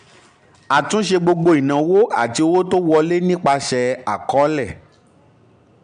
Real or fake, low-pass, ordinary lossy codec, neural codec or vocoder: real; 9.9 kHz; MP3, 64 kbps; none